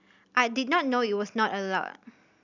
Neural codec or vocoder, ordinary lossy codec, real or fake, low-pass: none; none; real; 7.2 kHz